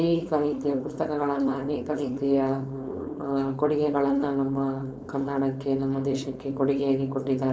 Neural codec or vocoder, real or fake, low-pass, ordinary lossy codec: codec, 16 kHz, 4.8 kbps, FACodec; fake; none; none